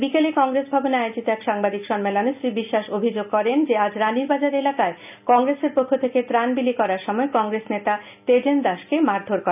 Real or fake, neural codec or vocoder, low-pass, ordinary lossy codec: real; none; 3.6 kHz; none